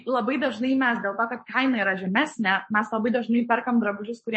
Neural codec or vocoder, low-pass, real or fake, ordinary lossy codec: codec, 44.1 kHz, 7.8 kbps, Pupu-Codec; 9.9 kHz; fake; MP3, 32 kbps